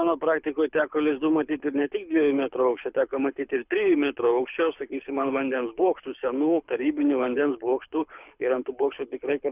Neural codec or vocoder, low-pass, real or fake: codec, 24 kHz, 6 kbps, HILCodec; 3.6 kHz; fake